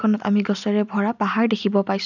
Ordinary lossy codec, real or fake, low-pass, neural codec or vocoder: none; real; 7.2 kHz; none